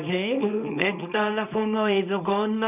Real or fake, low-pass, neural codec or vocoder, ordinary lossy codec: fake; 3.6 kHz; codec, 24 kHz, 0.9 kbps, WavTokenizer, small release; none